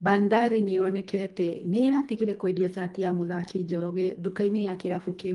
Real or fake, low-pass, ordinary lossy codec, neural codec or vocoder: fake; 10.8 kHz; Opus, 32 kbps; codec, 24 kHz, 1.5 kbps, HILCodec